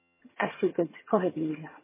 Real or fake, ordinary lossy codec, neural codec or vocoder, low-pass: fake; MP3, 16 kbps; vocoder, 22.05 kHz, 80 mel bands, HiFi-GAN; 3.6 kHz